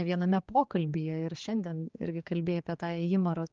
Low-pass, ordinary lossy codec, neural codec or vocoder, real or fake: 7.2 kHz; Opus, 32 kbps; codec, 16 kHz, 4 kbps, X-Codec, HuBERT features, trained on general audio; fake